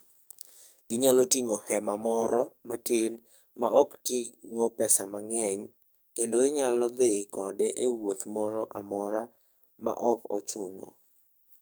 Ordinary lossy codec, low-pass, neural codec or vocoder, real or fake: none; none; codec, 44.1 kHz, 2.6 kbps, SNAC; fake